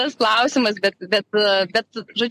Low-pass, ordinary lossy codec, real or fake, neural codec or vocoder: 14.4 kHz; MP3, 64 kbps; real; none